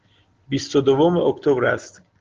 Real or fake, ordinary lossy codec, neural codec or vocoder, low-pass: real; Opus, 16 kbps; none; 7.2 kHz